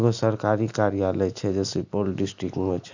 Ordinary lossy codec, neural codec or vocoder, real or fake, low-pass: none; none; real; 7.2 kHz